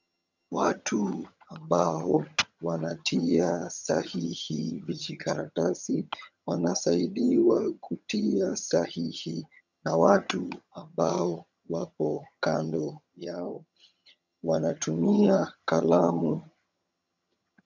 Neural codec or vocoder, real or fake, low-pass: vocoder, 22.05 kHz, 80 mel bands, HiFi-GAN; fake; 7.2 kHz